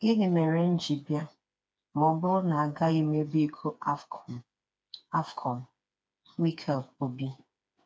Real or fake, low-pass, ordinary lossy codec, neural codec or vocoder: fake; none; none; codec, 16 kHz, 4 kbps, FreqCodec, smaller model